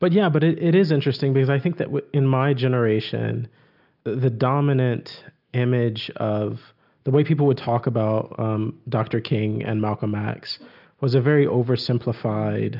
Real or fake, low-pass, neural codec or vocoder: real; 5.4 kHz; none